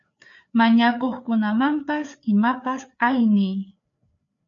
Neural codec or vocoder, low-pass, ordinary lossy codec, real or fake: codec, 16 kHz, 4 kbps, FreqCodec, larger model; 7.2 kHz; MP3, 64 kbps; fake